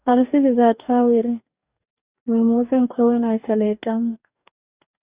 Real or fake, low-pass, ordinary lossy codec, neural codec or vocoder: fake; 3.6 kHz; Opus, 64 kbps; codec, 44.1 kHz, 2.6 kbps, DAC